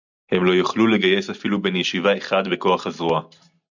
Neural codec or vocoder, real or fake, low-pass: none; real; 7.2 kHz